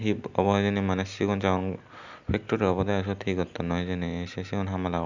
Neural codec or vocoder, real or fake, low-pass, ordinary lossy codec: none; real; 7.2 kHz; none